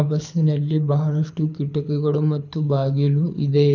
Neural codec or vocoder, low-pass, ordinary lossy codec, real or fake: codec, 24 kHz, 6 kbps, HILCodec; 7.2 kHz; none; fake